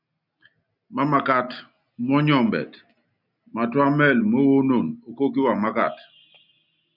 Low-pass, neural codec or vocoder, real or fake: 5.4 kHz; none; real